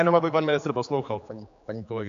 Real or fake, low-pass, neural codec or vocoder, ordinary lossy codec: fake; 7.2 kHz; codec, 16 kHz, 4 kbps, X-Codec, HuBERT features, trained on general audio; AAC, 64 kbps